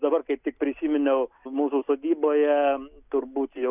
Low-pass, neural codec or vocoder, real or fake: 3.6 kHz; none; real